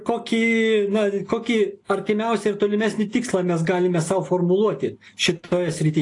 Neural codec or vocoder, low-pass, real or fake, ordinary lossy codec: none; 10.8 kHz; real; AAC, 48 kbps